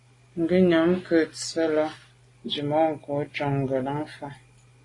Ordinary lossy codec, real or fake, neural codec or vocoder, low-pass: AAC, 32 kbps; real; none; 10.8 kHz